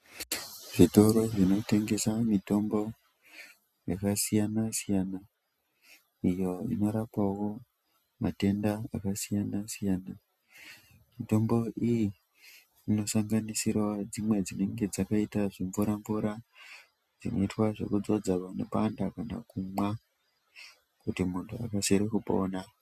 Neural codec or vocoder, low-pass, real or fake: none; 14.4 kHz; real